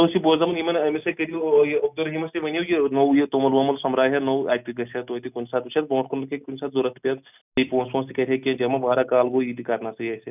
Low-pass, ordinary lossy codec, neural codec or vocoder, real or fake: 3.6 kHz; none; none; real